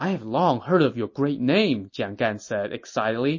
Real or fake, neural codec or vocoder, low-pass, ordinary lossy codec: real; none; 7.2 kHz; MP3, 32 kbps